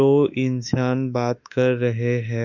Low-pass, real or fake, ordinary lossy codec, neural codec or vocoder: 7.2 kHz; fake; none; autoencoder, 48 kHz, 32 numbers a frame, DAC-VAE, trained on Japanese speech